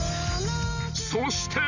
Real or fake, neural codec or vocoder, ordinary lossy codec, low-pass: real; none; none; 7.2 kHz